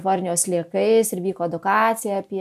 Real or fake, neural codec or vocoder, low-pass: real; none; 14.4 kHz